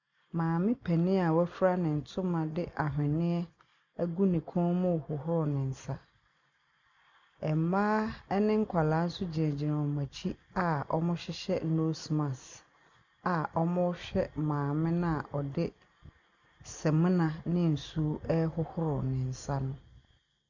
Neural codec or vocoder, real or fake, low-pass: none; real; 7.2 kHz